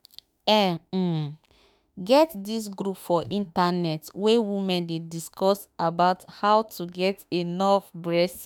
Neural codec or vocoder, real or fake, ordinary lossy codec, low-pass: autoencoder, 48 kHz, 32 numbers a frame, DAC-VAE, trained on Japanese speech; fake; none; none